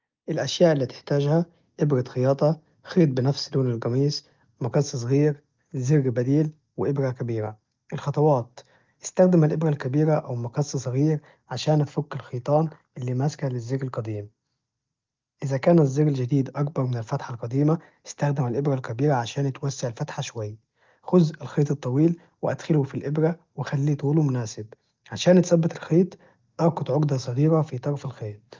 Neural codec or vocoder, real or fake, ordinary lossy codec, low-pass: none; real; Opus, 32 kbps; 7.2 kHz